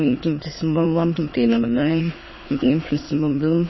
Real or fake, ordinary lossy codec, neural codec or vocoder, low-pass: fake; MP3, 24 kbps; autoencoder, 22.05 kHz, a latent of 192 numbers a frame, VITS, trained on many speakers; 7.2 kHz